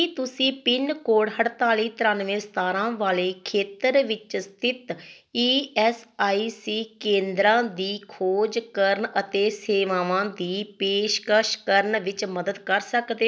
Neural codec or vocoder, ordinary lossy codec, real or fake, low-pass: none; none; real; none